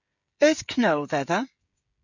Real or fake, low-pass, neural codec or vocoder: fake; 7.2 kHz; codec, 16 kHz, 16 kbps, FreqCodec, smaller model